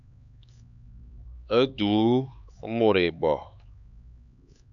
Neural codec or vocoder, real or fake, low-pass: codec, 16 kHz, 4 kbps, X-Codec, HuBERT features, trained on LibriSpeech; fake; 7.2 kHz